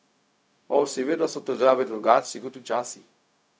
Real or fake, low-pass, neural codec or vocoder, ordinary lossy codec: fake; none; codec, 16 kHz, 0.4 kbps, LongCat-Audio-Codec; none